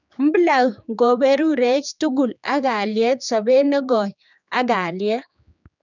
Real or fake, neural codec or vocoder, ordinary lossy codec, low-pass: fake; codec, 16 kHz, 4 kbps, X-Codec, HuBERT features, trained on general audio; none; 7.2 kHz